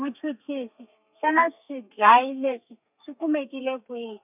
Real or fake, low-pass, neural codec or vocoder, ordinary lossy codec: fake; 3.6 kHz; codec, 32 kHz, 1.9 kbps, SNAC; none